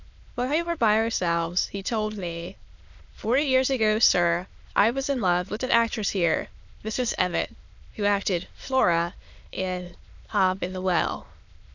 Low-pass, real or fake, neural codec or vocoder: 7.2 kHz; fake; autoencoder, 22.05 kHz, a latent of 192 numbers a frame, VITS, trained on many speakers